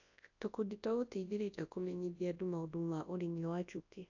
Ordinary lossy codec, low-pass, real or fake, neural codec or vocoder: AAC, 48 kbps; 7.2 kHz; fake; codec, 24 kHz, 0.9 kbps, WavTokenizer, large speech release